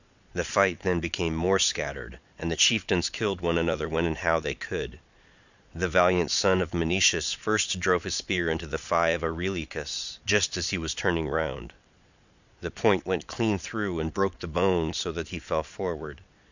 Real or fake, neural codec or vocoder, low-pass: fake; vocoder, 22.05 kHz, 80 mel bands, Vocos; 7.2 kHz